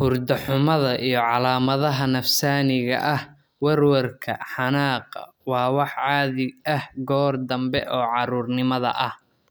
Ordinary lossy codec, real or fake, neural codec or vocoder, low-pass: none; real; none; none